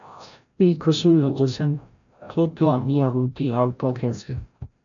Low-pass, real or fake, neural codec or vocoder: 7.2 kHz; fake; codec, 16 kHz, 0.5 kbps, FreqCodec, larger model